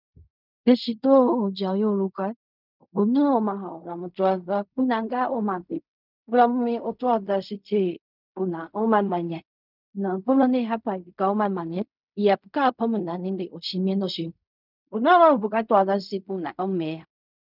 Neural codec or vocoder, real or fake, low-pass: codec, 16 kHz in and 24 kHz out, 0.4 kbps, LongCat-Audio-Codec, fine tuned four codebook decoder; fake; 5.4 kHz